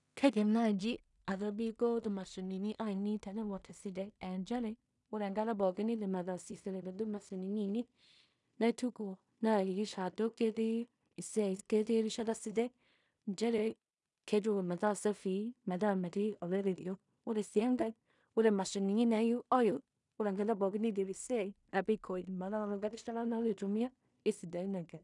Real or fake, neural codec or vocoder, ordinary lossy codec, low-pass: fake; codec, 16 kHz in and 24 kHz out, 0.4 kbps, LongCat-Audio-Codec, two codebook decoder; none; 10.8 kHz